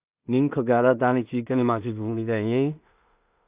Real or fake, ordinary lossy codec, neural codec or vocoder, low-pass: fake; Opus, 64 kbps; codec, 16 kHz in and 24 kHz out, 0.4 kbps, LongCat-Audio-Codec, two codebook decoder; 3.6 kHz